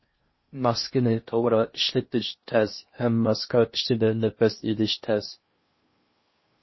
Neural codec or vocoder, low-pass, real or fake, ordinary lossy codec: codec, 16 kHz in and 24 kHz out, 0.6 kbps, FocalCodec, streaming, 4096 codes; 7.2 kHz; fake; MP3, 24 kbps